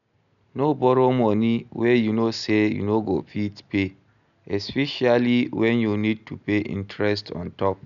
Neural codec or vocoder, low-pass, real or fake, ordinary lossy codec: none; 7.2 kHz; real; none